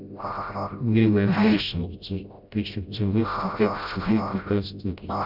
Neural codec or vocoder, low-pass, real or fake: codec, 16 kHz, 0.5 kbps, FreqCodec, smaller model; 5.4 kHz; fake